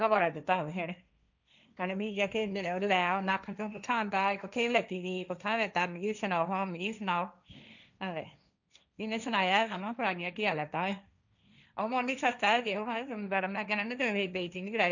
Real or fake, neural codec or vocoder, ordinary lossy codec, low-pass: fake; codec, 16 kHz, 1.1 kbps, Voila-Tokenizer; none; 7.2 kHz